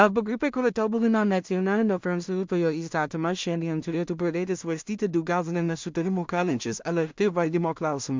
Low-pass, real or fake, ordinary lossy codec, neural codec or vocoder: 7.2 kHz; fake; MP3, 64 kbps; codec, 16 kHz in and 24 kHz out, 0.4 kbps, LongCat-Audio-Codec, two codebook decoder